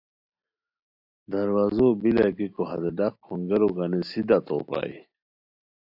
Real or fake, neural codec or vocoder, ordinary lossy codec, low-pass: real; none; AAC, 48 kbps; 5.4 kHz